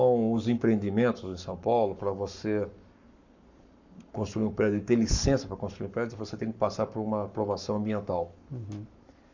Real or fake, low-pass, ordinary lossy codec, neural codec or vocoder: fake; 7.2 kHz; none; codec, 44.1 kHz, 7.8 kbps, Pupu-Codec